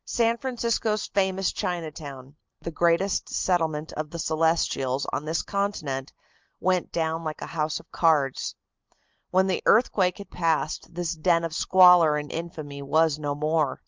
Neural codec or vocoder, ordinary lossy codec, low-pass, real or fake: none; Opus, 32 kbps; 7.2 kHz; real